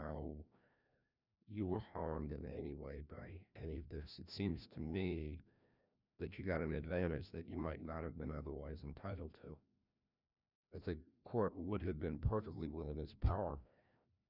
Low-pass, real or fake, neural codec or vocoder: 5.4 kHz; fake; codec, 16 kHz, 1 kbps, FreqCodec, larger model